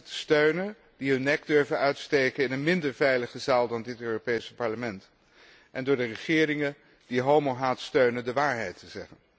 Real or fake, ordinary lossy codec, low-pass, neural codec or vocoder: real; none; none; none